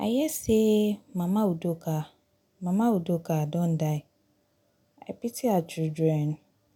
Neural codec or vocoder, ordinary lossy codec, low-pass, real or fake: none; none; 19.8 kHz; real